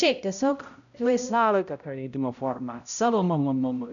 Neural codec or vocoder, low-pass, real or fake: codec, 16 kHz, 0.5 kbps, X-Codec, HuBERT features, trained on balanced general audio; 7.2 kHz; fake